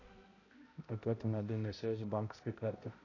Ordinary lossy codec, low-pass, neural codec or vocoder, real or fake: AAC, 32 kbps; 7.2 kHz; codec, 16 kHz, 0.5 kbps, X-Codec, HuBERT features, trained on balanced general audio; fake